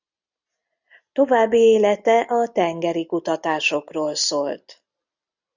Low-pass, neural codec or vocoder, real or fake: 7.2 kHz; none; real